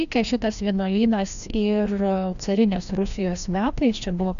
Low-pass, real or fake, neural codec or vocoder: 7.2 kHz; fake; codec, 16 kHz, 1 kbps, FreqCodec, larger model